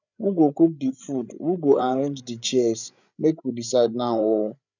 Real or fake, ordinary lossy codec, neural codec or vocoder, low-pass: fake; none; codec, 16 kHz, 8 kbps, FreqCodec, larger model; 7.2 kHz